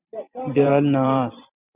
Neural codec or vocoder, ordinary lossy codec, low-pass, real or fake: vocoder, 44.1 kHz, 128 mel bands every 512 samples, BigVGAN v2; Opus, 64 kbps; 3.6 kHz; fake